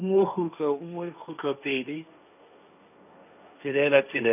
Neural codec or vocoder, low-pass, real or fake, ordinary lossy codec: codec, 16 kHz, 1.1 kbps, Voila-Tokenizer; 3.6 kHz; fake; none